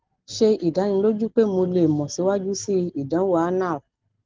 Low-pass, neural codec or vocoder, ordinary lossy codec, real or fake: 7.2 kHz; none; Opus, 16 kbps; real